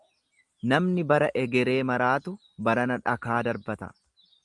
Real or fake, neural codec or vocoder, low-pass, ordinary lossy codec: real; none; 10.8 kHz; Opus, 32 kbps